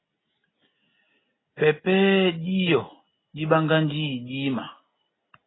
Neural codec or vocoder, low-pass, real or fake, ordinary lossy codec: none; 7.2 kHz; real; AAC, 16 kbps